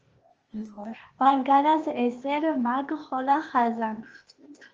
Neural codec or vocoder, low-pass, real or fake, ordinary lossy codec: codec, 16 kHz, 0.8 kbps, ZipCodec; 7.2 kHz; fake; Opus, 24 kbps